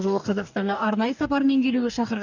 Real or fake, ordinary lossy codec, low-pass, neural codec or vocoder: fake; none; 7.2 kHz; codec, 44.1 kHz, 2.6 kbps, DAC